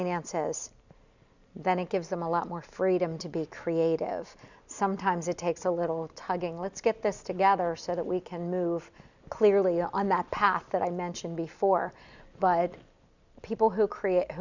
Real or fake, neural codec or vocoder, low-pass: real; none; 7.2 kHz